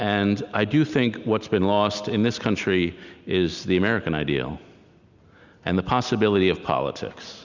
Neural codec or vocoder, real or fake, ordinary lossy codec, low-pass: none; real; Opus, 64 kbps; 7.2 kHz